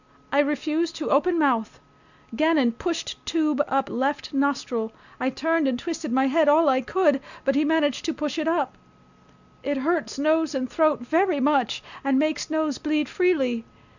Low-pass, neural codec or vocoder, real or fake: 7.2 kHz; none; real